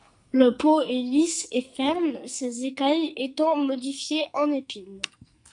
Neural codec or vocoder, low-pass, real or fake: codec, 44.1 kHz, 2.6 kbps, SNAC; 10.8 kHz; fake